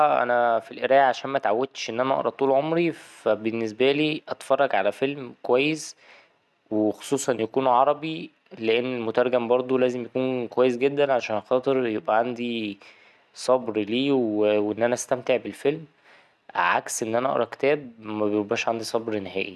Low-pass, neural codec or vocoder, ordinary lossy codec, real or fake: none; none; none; real